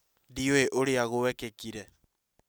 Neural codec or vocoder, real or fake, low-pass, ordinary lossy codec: none; real; none; none